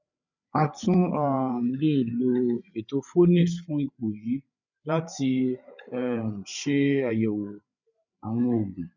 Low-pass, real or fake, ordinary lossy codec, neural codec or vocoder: 7.2 kHz; fake; none; codec, 16 kHz, 8 kbps, FreqCodec, larger model